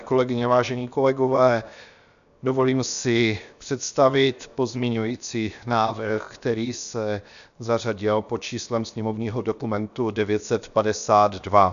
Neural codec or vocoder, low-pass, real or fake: codec, 16 kHz, 0.7 kbps, FocalCodec; 7.2 kHz; fake